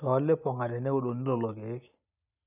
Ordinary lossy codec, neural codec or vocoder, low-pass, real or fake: none; none; 3.6 kHz; real